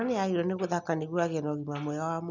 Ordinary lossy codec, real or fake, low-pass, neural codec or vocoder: none; real; 7.2 kHz; none